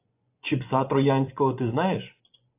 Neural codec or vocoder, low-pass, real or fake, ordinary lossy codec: vocoder, 24 kHz, 100 mel bands, Vocos; 3.6 kHz; fake; AAC, 32 kbps